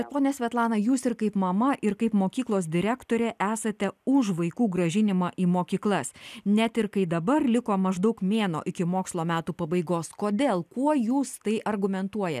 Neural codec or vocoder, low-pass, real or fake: none; 14.4 kHz; real